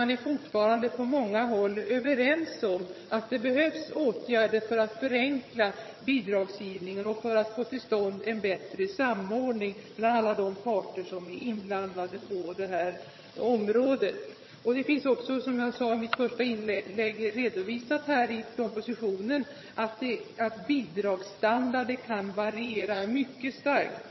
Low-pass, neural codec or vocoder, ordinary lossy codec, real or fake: 7.2 kHz; vocoder, 22.05 kHz, 80 mel bands, HiFi-GAN; MP3, 24 kbps; fake